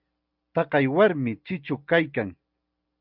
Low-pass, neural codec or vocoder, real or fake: 5.4 kHz; none; real